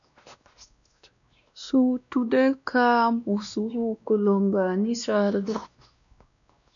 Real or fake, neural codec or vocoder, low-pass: fake; codec, 16 kHz, 1 kbps, X-Codec, WavLM features, trained on Multilingual LibriSpeech; 7.2 kHz